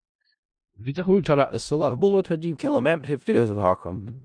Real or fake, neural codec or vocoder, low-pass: fake; codec, 16 kHz in and 24 kHz out, 0.4 kbps, LongCat-Audio-Codec, four codebook decoder; 9.9 kHz